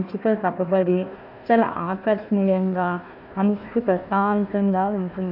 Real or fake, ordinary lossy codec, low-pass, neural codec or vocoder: fake; none; 5.4 kHz; codec, 16 kHz, 1 kbps, FunCodec, trained on Chinese and English, 50 frames a second